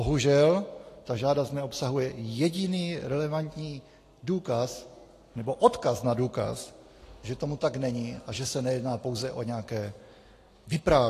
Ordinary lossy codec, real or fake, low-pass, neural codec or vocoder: AAC, 48 kbps; real; 14.4 kHz; none